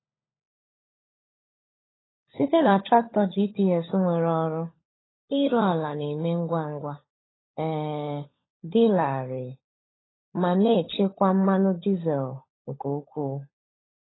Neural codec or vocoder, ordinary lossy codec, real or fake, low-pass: codec, 16 kHz, 16 kbps, FunCodec, trained on LibriTTS, 50 frames a second; AAC, 16 kbps; fake; 7.2 kHz